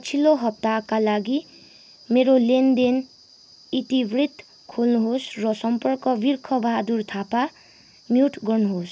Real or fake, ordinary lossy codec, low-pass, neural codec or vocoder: real; none; none; none